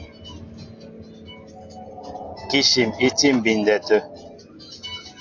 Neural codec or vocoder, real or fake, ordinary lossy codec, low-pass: none; real; AAC, 48 kbps; 7.2 kHz